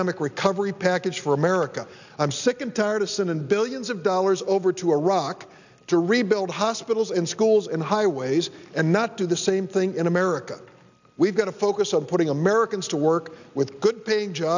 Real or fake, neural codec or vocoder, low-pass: real; none; 7.2 kHz